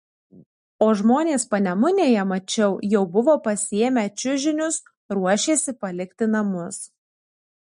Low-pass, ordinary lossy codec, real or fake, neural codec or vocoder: 10.8 kHz; MP3, 48 kbps; real; none